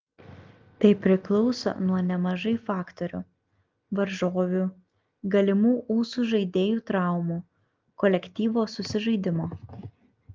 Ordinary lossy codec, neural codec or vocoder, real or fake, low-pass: Opus, 32 kbps; none; real; 7.2 kHz